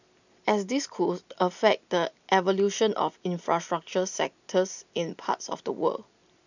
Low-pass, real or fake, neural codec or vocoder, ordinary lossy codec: 7.2 kHz; real; none; none